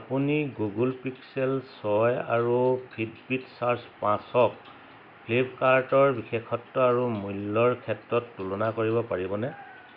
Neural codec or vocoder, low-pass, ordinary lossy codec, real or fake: none; 5.4 kHz; none; real